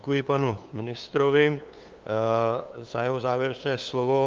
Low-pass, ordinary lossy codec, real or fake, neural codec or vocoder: 7.2 kHz; Opus, 24 kbps; fake; codec, 16 kHz, 2 kbps, FunCodec, trained on LibriTTS, 25 frames a second